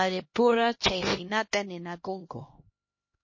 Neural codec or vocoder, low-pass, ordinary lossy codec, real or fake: codec, 16 kHz, 1 kbps, X-Codec, HuBERT features, trained on LibriSpeech; 7.2 kHz; MP3, 32 kbps; fake